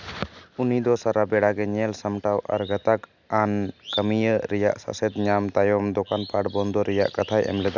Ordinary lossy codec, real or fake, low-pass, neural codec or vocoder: none; real; 7.2 kHz; none